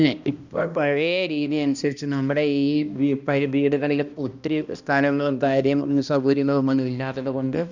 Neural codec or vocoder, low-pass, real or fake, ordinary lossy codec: codec, 16 kHz, 1 kbps, X-Codec, HuBERT features, trained on balanced general audio; 7.2 kHz; fake; none